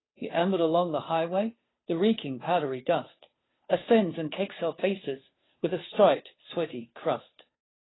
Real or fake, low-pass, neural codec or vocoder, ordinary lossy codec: fake; 7.2 kHz; codec, 16 kHz, 2 kbps, FunCodec, trained on Chinese and English, 25 frames a second; AAC, 16 kbps